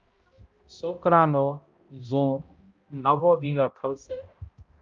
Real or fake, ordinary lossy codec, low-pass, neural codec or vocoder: fake; Opus, 24 kbps; 7.2 kHz; codec, 16 kHz, 0.5 kbps, X-Codec, HuBERT features, trained on balanced general audio